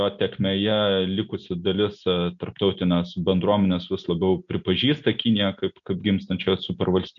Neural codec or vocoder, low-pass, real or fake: none; 7.2 kHz; real